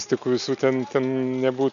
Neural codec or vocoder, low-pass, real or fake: none; 7.2 kHz; real